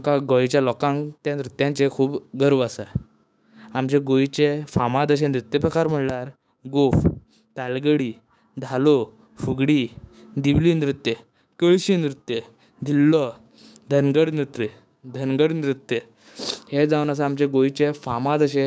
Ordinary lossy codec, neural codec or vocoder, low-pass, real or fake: none; codec, 16 kHz, 6 kbps, DAC; none; fake